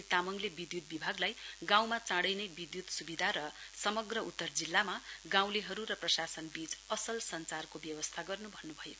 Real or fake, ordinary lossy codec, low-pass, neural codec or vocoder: real; none; none; none